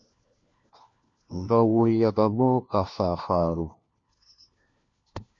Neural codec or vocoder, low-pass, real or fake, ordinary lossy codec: codec, 16 kHz, 1 kbps, FunCodec, trained on LibriTTS, 50 frames a second; 7.2 kHz; fake; MP3, 48 kbps